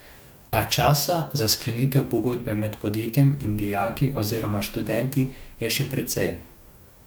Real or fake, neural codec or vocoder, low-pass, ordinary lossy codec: fake; codec, 44.1 kHz, 2.6 kbps, DAC; none; none